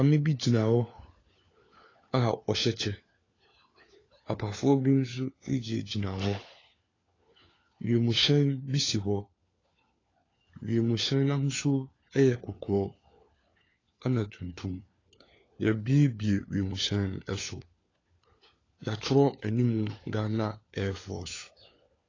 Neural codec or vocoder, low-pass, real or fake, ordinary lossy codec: codec, 16 kHz, 4 kbps, FunCodec, trained on LibriTTS, 50 frames a second; 7.2 kHz; fake; AAC, 32 kbps